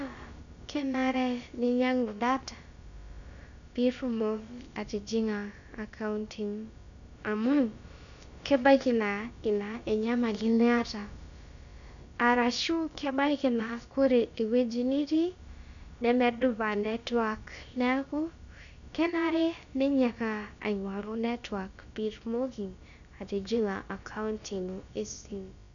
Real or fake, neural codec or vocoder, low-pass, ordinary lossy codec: fake; codec, 16 kHz, about 1 kbps, DyCAST, with the encoder's durations; 7.2 kHz; MP3, 96 kbps